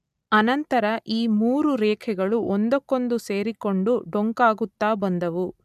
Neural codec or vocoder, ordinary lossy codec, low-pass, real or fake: none; none; 14.4 kHz; real